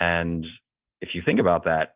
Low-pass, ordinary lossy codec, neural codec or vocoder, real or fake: 3.6 kHz; Opus, 32 kbps; none; real